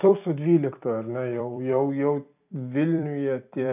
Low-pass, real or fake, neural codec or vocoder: 3.6 kHz; fake; vocoder, 44.1 kHz, 128 mel bands every 256 samples, BigVGAN v2